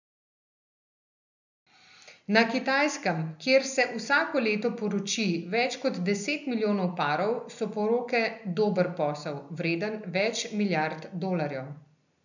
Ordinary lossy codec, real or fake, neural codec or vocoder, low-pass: none; real; none; 7.2 kHz